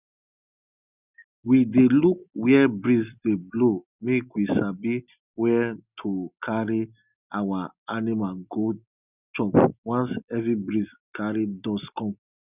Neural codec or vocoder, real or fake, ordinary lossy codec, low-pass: none; real; none; 3.6 kHz